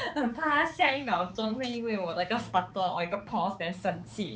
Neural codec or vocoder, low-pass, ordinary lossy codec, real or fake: codec, 16 kHz, 4 kbps, X-Codec, HuBERT features, trained on balanced general audio; none; none; fake